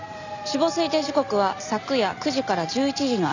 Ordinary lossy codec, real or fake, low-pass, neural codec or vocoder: none; real; 7.2 kHz; none